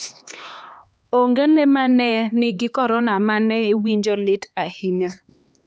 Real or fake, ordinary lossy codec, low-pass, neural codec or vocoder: fake; none; none; codec, 16 kHz, 2 kbps, X-Codec, HuBERT features, trained on LibriSpeech